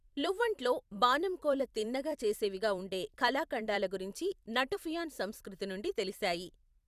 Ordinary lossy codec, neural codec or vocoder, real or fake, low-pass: Opus, 64 kbps; vocoder, 44.1 kHz, 128 mel bands every 256 samples, BigVGAN v2; fake; 14.4 kHz